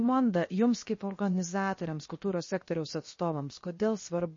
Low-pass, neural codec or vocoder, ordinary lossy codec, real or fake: 7.2 kHz; codec, 16 kHz, 0.7 kbps, FocalCodec; MP3, 32 kbps; fake